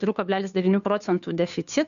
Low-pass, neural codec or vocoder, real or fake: 7.2 kHz; codec, 16 kHz, 2 kbps, FunCodec, trained on Chinese and English, 25 frames a second; fake